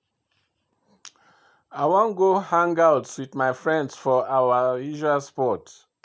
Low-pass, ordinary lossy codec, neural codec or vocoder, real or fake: none; none; none; real